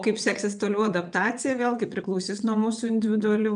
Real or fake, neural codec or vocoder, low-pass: fake; vocoder, 22.05 kHz, 80 mel bands, WaveNeXt; 9.9 kHz